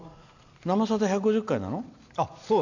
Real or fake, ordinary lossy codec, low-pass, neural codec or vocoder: real; none; 7.2 kHz; none